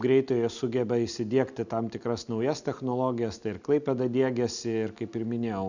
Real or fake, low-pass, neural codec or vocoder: real; 7.2 kHz; none